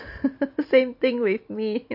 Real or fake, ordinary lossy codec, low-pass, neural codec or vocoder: real; MP3, 32 kbps; 5.4 kHz; none